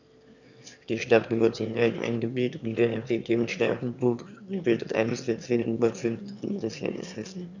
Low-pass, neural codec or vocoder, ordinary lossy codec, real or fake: 7.2 kHz; autoencoder, 22.05 kHz, a latent of 192 numbers a frame, VITS, trained on one speaker; none; fake